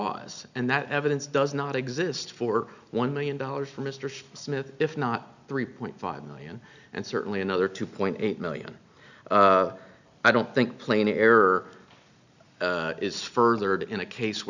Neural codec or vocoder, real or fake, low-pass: none; real; 7.2 kHz